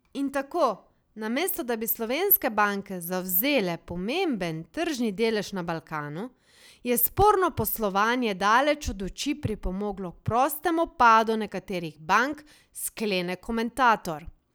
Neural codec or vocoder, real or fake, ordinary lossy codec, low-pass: none; real; none; none